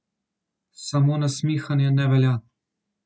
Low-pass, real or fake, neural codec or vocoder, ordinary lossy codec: none; real; none; none